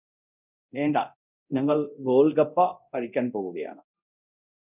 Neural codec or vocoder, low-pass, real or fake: codec, 24 kHz, 0.9 kbps, DualCodec; 3.6 kHz; fake